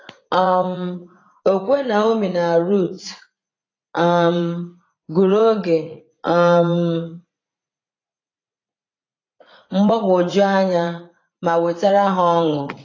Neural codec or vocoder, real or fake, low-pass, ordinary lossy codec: vocoder, 44.1 kHz, 80 mel bands, Vocos; fake; 7.2 kHz; AAC, 32 kbps